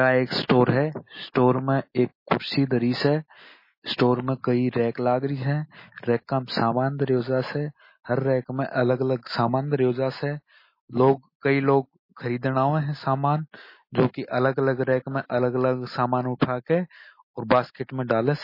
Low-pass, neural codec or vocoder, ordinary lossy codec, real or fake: 5.4 kHz; none; MP3, 24 kbps; real